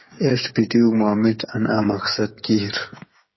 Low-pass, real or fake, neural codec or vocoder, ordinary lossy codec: 7.2 kHz; fake; codec, 16 kHz, 8 kbps, FreqCodec, smaller model; MP3, 24 kbps